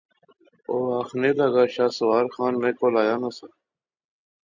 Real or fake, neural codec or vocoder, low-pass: real; none; 7.2 kHz